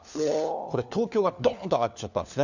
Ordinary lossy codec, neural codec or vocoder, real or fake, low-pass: none; codec, 16 kHz, 4 kbps, FunCodec, trained on LibriTTS, 50 frames a second; fake; 7.2 kHz